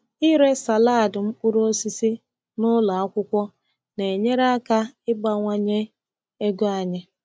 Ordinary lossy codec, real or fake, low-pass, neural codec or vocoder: none; real; none; none